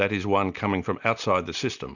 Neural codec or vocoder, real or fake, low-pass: none; real; 7.2 kHz